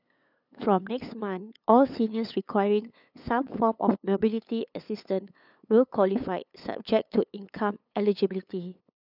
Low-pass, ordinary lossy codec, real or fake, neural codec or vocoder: 5.4 kHz; none; fake; codec, 16 kHz, 8 kbps, FunCodec, trained on LibriTTS, 25 frames a second